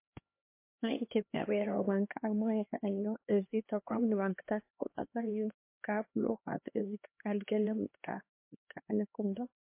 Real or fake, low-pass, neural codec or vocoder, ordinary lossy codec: fake; 3.6 kHz; codec, 16 kHz, 2 kbps, X-Codec, HuBERT features, trained on LibriSpeech; MP3, 24 kbps